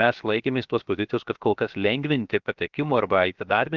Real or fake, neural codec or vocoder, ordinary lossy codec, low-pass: fake; codec, 16 kHz, 0.7 kbps, FocalCodec; Opus, 24 kbps; 7.2 kHz